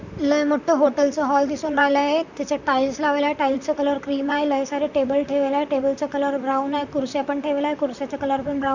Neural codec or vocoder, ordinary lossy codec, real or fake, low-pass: vocoder, 44.1 kHz, 128 mel bands, Pupu-Vocoder; none; fake; 7.2 kHz